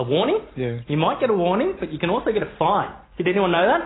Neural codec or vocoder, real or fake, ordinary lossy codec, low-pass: none; real; AAC, 16 kbps; 7.2 kHz